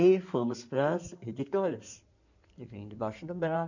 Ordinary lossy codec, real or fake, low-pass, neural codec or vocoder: none; fake; 7.2 kHz; codec, 16 kHz in and 24 kHz out, 2.2 kbps, FireRedTTS-2 codec